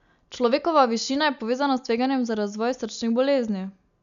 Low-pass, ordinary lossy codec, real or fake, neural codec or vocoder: 7.2 kHz; none; real; none